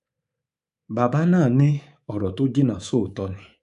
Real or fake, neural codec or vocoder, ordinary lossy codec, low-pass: fake; codec, 24 kHz, 3.1 kbps, DualCodec; AAC, 96 kbps; 10.8 kHz